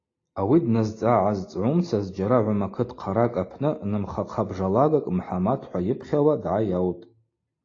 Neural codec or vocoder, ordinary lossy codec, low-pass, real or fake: none; AAC, 32 kbps; 7.2 kHz; real